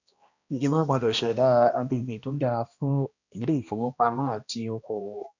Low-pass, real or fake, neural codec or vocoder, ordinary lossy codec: 7.2 kHz; fake; codec, 16 kHz, 1 kbps, X-Codec, HuBERT features, trained on balanced general audio; none